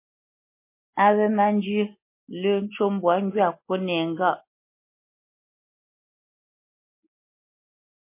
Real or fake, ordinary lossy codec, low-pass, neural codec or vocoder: real; MP3, 24 kbps; 3.6 kHz; none